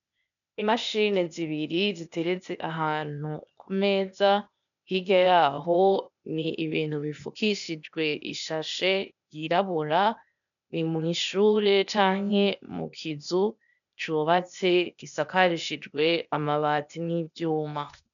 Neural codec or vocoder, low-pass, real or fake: codec, 16 kHz, 0.8 kbps, ZipCodec; 7.2 kHz; fake